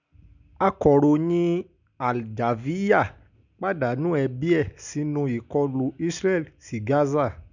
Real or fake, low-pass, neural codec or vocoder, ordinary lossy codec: real; 7.2 kHz; none; none